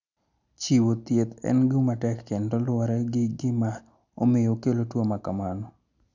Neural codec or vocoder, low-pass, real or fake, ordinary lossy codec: none; 7.2 kHz; real; none